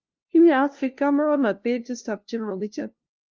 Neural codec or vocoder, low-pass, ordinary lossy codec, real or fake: codec, 16 kHz, 0.5 kbps, FunCodec, trained on LibriTTS, 25 frames a second; 7.2 kHz; Opus, 32 kbps; fake